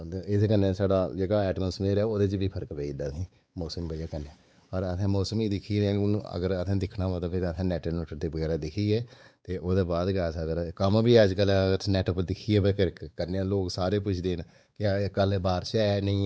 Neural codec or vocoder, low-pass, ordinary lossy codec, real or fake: codec, 16 kHz, 4 kbps, X-Codec, WavLM features, trained on Multilingual LibriSpeech; none; none; fake